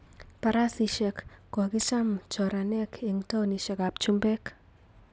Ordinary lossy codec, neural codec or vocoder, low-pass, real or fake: none; none; none; real